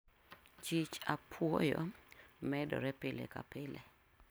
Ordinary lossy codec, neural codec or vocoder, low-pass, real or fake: none; none; none; real